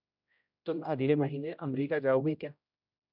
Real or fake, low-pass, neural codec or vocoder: fake; 5.4 kHz; codec, 16 kHz, 0.5 kbps, X-Codec, HuBERT features, trained on general audio